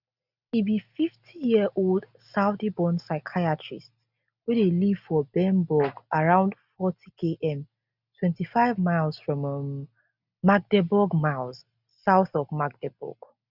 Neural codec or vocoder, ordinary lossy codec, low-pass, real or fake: none; none; 5.4 kHz; real